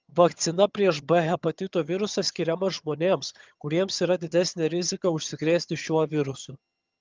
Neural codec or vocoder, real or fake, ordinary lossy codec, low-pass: vocoder, 22.05 kHz, 80 mel bands, HiFi-GAN; fake; Opus, 24 kbps; 7.2 kHz